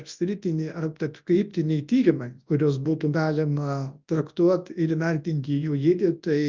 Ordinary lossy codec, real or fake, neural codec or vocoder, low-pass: Opus, 24 kbps; fake; codec, 24 kHz, 0.9 kbps, WavTokenizer, large speech release; 7.2 kHz